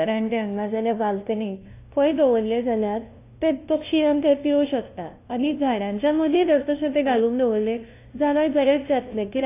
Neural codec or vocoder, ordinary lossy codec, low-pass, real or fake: codec, 16 kHz, 0.5 kbps, FunCodec, trained on LibriTTS, 25 frames a second; AAC, 24 kbps; 3.6 kHz; fake